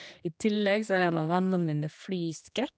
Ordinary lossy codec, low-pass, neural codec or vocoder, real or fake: none; none; codec, 16 kHz, 2 kbps, X-Codec, HuBERT features, trained on general audio; fake